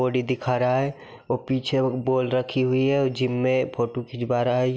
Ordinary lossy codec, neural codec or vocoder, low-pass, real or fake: none; none; none; real